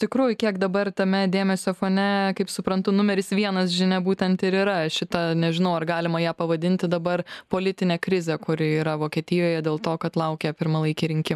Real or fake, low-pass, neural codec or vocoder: real; 14.4 kHz; none